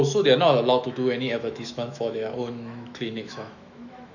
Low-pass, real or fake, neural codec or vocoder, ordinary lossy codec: 7.2 kHz; fake; autoencoder, 48 kHz, 128 numbers a frame, DAC-VAE, trained on Japanese speech; none